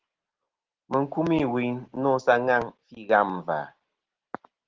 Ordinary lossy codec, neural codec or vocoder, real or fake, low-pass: Opus, 16 kbps; none; real; 7.2 kHz